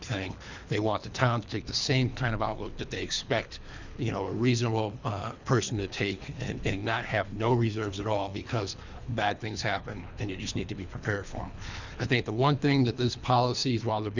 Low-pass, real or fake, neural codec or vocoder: 7.2 kHz; fake; codec, 24 kHz, 3 kbps, HILCodec